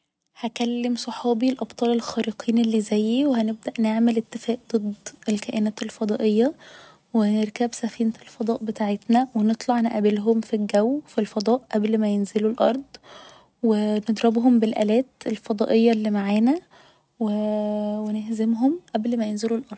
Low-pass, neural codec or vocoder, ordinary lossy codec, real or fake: none; none; none; real